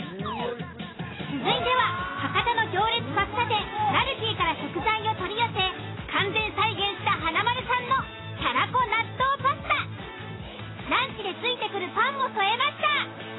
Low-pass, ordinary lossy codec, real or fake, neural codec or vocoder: 7.2 kHz; AAC, 16 kbps; real; none